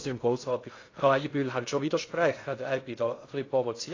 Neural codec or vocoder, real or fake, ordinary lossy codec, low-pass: codec, 16 kHz in and 24 kHz out, 0.6 kbps, FocalCodec, streaming, 2048 codes; fake; AAC, 32 kbps; 7.2 kHz